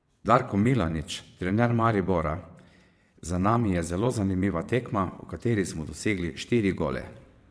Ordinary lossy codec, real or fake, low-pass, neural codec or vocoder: none; fake; none; vocoder, 22.05 kHz, 80 mel bands, WaveNeXt